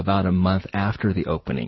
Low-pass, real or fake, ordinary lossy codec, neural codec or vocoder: 7.2 kHz; real; MP3, 24 kbps; none